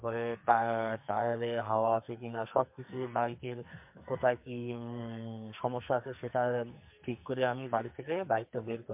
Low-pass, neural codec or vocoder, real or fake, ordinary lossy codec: 3.6 kHz; codec, 44.1 kHz, 2.6 kbps, SNAC; fake; MP3, 32 kbps